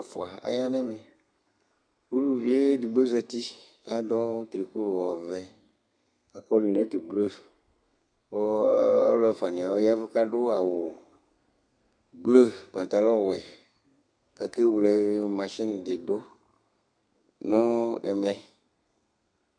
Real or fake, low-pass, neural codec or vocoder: fake; 9.9 kHz; codec, 32 kHz, 1.9 kbps, SNAC